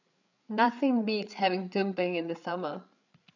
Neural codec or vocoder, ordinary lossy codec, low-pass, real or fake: codec, 16 kHz, 8 kbps, FreqCodec, larger model; none; 7.2 kHz; fake